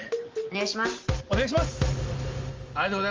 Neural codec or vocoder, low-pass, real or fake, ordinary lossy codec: none; 7.2 kHz; real; Opus, 32 kbps